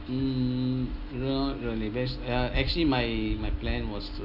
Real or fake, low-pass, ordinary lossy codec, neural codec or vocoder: real; 5.4 kHz; none; none